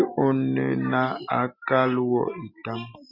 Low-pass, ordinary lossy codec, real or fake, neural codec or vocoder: 5.4 kHz; Opus, 64 kbps; real; none